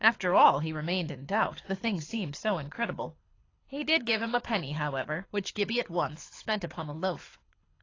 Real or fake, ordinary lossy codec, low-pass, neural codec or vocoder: fake; AAC, 32 kbps; 7.2 kHz; codec, 24 kHz, 6 kbps, HILCodec